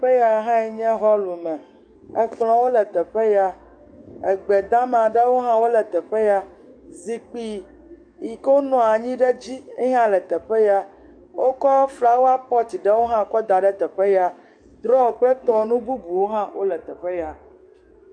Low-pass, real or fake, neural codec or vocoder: 9.9 kHz; fake; codec, 44.1 kHz, 7.8 kbps, DAC